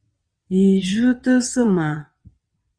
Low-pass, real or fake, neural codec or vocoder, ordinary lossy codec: 9.9 kHz; fake; vocoder, 22.05 kHz, 80 mel bands, WaveNeXt; Opus, 64 kbps